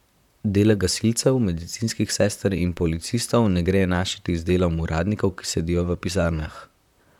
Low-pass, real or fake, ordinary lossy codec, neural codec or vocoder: 19.8 kHz; fake; none; vocoder, 44.1 kHz, 128 mel bands, Pupu-Vocoder